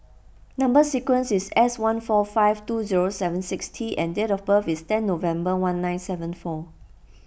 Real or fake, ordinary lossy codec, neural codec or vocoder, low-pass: real; none; none; none